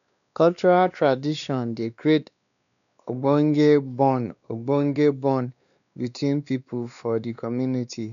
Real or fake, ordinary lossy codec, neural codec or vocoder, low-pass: fake; none; codec, 16 kHz, 2 kbps, X-Codec, WavLM features, trained on Multilingual LibriSpeech; 7.2 kHz